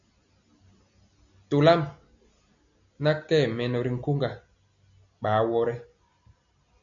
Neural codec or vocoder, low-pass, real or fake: none; 7.2 kHz; real